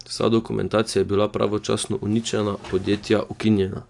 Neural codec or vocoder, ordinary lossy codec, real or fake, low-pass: none; AAC, 64 kbps; real; 10.8 kHz